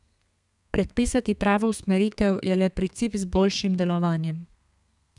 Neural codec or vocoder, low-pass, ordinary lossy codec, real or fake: codec, 32 kHz, 1.9 kbps, SNAC; 10.8 kHz; none; fake